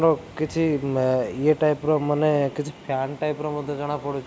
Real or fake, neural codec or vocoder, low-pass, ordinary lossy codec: real; none; none; none